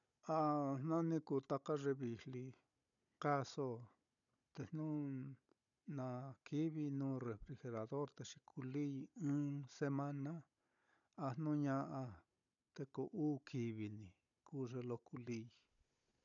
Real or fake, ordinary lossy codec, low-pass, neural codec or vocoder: fake; none; 7.2 kHz; codec, 16 kHz, 16 kbps, FunCodec, trained on Chinese and English, 50 frames a second